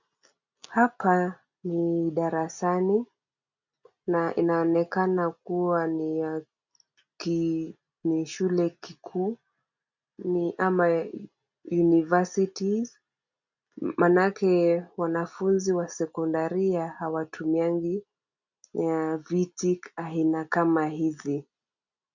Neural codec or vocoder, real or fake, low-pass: none; real; 7.2 kHz